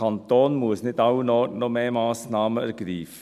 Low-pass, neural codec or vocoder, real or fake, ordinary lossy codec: 14.4 kHz; none; real; none